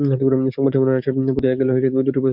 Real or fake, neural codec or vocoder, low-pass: fake; codec, 44.1 kHz, 7.8 kbps, DAC; 5.4 kHz